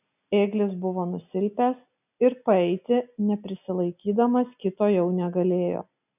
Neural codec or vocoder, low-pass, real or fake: none; 3.6 kHz; real